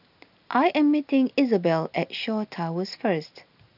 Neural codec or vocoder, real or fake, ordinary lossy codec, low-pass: none; real; none; 5.4 kHz